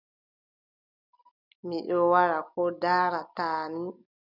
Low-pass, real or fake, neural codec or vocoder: 5.4 kHz; real; none